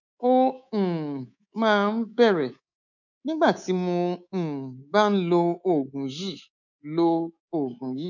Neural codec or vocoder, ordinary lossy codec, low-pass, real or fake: codec, 24 kHz, 3.1 kbps, DualCodec; none; 7.2 kHz; fake